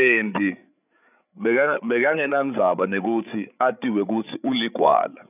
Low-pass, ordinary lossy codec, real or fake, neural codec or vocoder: 3.6 kHz; none; fake; codec, 16 kHz, 8 kbps, FreqCodec, larger model